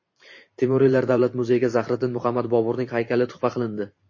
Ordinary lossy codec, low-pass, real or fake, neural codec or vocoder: MP3, 32 kbps; 7.2 kHz; fake; vocoder, 44.1 kHz, 128 mel bands every 512 samples, BigVGAN v2